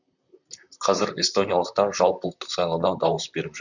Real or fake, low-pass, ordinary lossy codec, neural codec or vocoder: fake; 7.2 kHz; none; vocoder, 44.1 kHz, 128 mel bands, Pupu-Vocoder